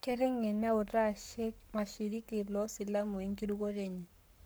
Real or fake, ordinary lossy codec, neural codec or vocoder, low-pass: fake; none; codec, 44.1 kHz, 7.8 kbps, Pupu-Codec; none